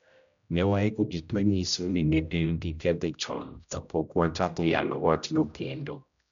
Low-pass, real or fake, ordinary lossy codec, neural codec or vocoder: 7.2 kHz; fake; none; codec, 16 kHz, 0.5 kbps, X-Codec, HuBERT features, trained on general audio